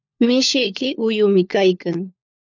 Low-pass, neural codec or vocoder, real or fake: 7.2 kHz; codec, 16 kHz, 4 kbps, FunCodec, trained on LibriTTS, 50 frames a second; fake